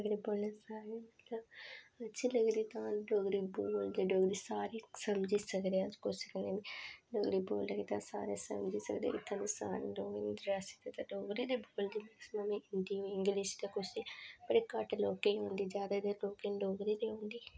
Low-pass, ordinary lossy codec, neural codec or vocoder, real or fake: none; none; none; real